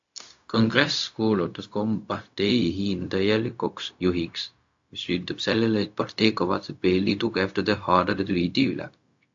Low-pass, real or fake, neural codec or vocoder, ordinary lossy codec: 7.2 kHz; fake; codec, 16 kHz, 0.4 kbps, LongCat-Audio-Codec; AAC, 48 kbps